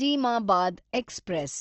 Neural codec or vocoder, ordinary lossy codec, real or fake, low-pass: none; Opus, 16 kbps; real; 7.2 kHz